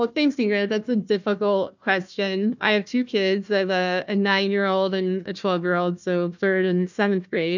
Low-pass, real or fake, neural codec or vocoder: 7.2 kHz; fake; codec, 16 kHz, 1 kbps, FunCodec, trained on Chinese and English, 50 frames a second